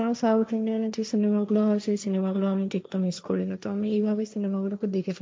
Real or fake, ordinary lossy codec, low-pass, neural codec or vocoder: fake; none; none; codec, 16 kHz, 1.1 kbps, Voila-Tokenizer